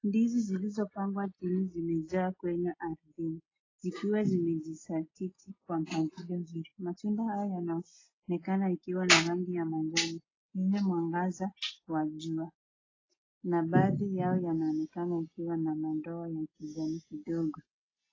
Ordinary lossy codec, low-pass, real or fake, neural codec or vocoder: AAC, 32 kbps; 7.2 kHz; real; none